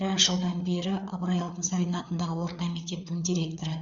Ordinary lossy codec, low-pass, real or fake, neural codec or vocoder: none; 7.2 kHz; fake; codec, 16 kHz, 4 kbps, FreqCodec, larger model